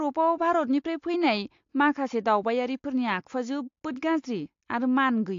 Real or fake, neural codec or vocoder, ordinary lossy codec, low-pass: real; none; AAC, 48 kbps; 7.2 kHz